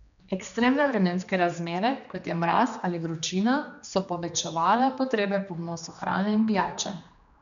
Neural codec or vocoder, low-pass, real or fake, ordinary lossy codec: codec, 16 kHz, 2 kbps, X-Codec, HuBERT features, trained on general audio; 7.2 kHz; fake; none